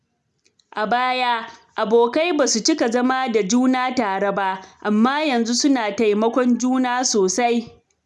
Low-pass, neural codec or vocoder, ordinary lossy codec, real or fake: none; none; none; real